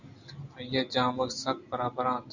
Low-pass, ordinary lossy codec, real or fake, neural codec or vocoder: 7.2 kHz; Opus, 64 kbps; real; none